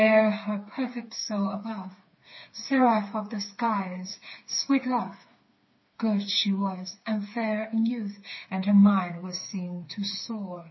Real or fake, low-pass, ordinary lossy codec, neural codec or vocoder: fake; 7.2 kHz; MP3, 24 kbps; codec, 16 kHz, 4 kbps, FreqCodec, smaller model